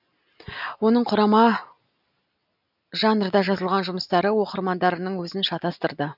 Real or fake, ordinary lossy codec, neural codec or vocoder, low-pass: real; none; none; 5.4 kHz